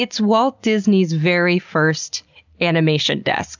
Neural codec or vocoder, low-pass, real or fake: none; 7.2 kHz; real